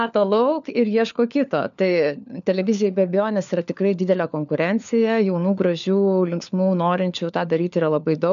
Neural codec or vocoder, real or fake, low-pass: codec, 16 kHz, 4 kbps, FunCodec, trained on LibriTTS, 50 frames a second; fake; 7.2 kHz